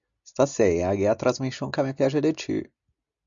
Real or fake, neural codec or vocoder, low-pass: real; none; 7.2 kHz